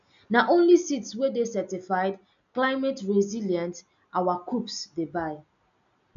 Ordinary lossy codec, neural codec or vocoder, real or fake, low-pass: none; none; real; 7.2 kHz